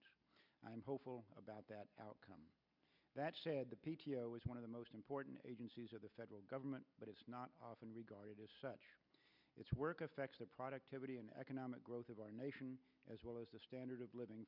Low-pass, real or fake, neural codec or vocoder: 5.4 kHz; real; none